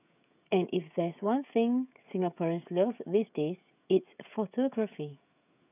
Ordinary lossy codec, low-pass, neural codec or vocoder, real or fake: none; 3.6 kHz; codec, 16 kHz, 16 kbps, FreqCodec, smaller model; fake